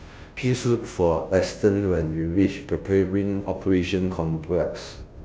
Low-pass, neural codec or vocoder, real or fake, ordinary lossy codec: none; codec, 16 kHz, 0.5 kbps, FunCodec, trained on Chinese and English, 25 frames a second; fake; none